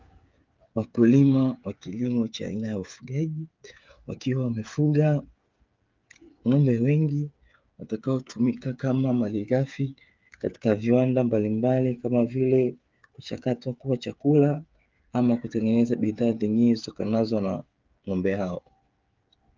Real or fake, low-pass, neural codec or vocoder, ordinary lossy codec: fake; 7.2 kHz; codec, 16 kHz, 8 kbps, FreqCodec, smaller model; Opus, 24 kbps